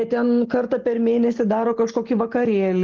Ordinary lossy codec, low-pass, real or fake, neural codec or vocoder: Opus, 16 kbps; 7.2 kHz; real; none